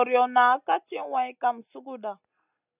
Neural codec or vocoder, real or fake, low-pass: none; real; 3.6 kHz